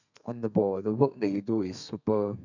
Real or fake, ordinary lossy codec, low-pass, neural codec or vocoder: fake; none; 7.2 kHz; codec, 32 kHz, 1.9 kbps, SNAC